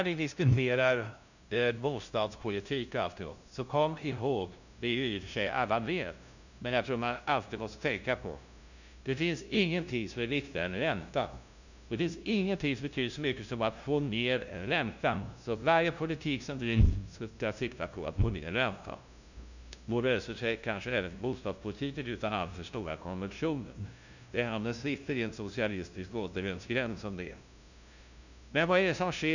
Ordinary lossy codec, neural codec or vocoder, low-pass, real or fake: none; codec, 16 kHz, 0.5 kbps, FunCodec, trained on LibriTTS, 25 frames a second; 7.2 kHz; fake